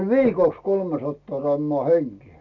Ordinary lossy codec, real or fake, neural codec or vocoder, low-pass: none; real; none; 7.2 kHz